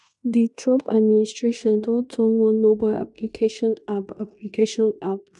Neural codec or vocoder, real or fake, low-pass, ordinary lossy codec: codec, 16 kHz in and 24 kHz out, 0.9 kbps, LongCat-Audio-Codec, fine tuned four codebook decoder; fake; 10.8 kHz; none